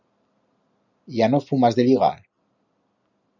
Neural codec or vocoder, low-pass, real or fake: none; 7.2 kHz; real